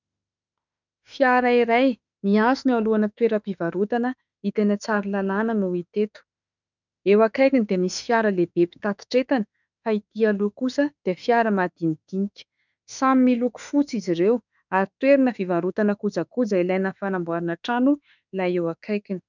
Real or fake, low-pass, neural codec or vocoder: fake; 7.2 kHz; autoencoder, 48 kHz, 32 numbers a frame, DAC-VAE, trained on Japanese speech